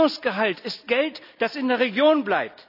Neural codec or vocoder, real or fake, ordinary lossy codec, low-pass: none; real; none; 5.4 kHz